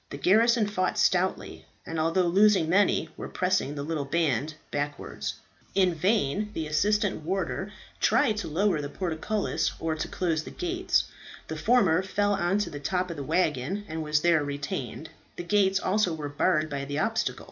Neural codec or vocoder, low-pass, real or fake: none; 7.2 kHz; real